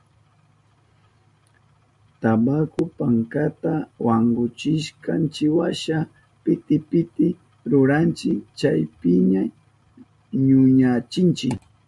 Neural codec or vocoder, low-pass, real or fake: none; 10.8 kHz; real